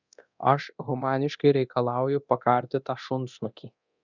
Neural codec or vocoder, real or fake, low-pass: codec, 24 kHz, 0.9 kbps, DualCodec; fake; 7.2 kHz